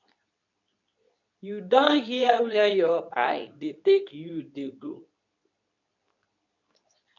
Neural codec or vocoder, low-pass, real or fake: codec, 24 kHz, 0.9 kbps, WavTokenizer, medium speech release version 2; 7.2 kHz; fake